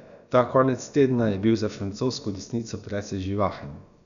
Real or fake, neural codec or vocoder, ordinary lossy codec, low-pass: fake; codec, 16 kHz, about 1 kbps, DyCAST, with the encoder's durations; none; 7.2 kHz